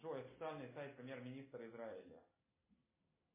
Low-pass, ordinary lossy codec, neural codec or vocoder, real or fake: 3.6 kHz; MP3, 16 kbps; none; real